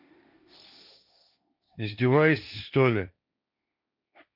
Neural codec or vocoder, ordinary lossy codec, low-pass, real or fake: codec, 16 kHz, 1.1 kbps, Voila-Tokenizer; none; 5.4 kHz; fake